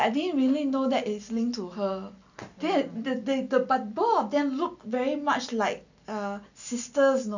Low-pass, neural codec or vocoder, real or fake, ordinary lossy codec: 7.2 kHz; none; real; none